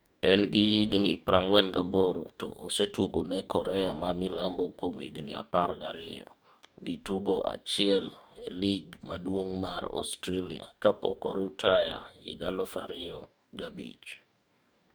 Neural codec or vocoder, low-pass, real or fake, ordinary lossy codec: codec, 44.1 kHz, 2.6 kbps, DAC; none; fake; none